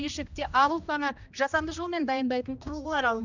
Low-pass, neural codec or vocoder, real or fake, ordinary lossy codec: 7.2 kHz; codec, 16 kHz, 1 kbps, X-Codec, HuBERT features, trained on general audio; fake; none